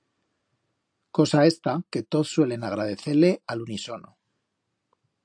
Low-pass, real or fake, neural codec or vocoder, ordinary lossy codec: 9.9 kHz; real; none; AAC, 64 kbps